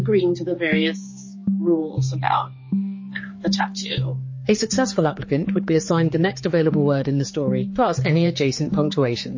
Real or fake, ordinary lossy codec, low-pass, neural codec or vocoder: fake; MP3, 32 kbps; 7.2 kHz; codec, 16 kHz, 4 kbps, X-Codec, HuBERT features, trained on general audio